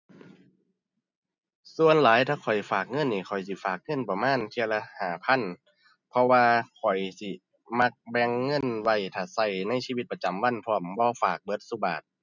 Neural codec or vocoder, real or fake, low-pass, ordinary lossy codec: none; real; 7.2 kHz; none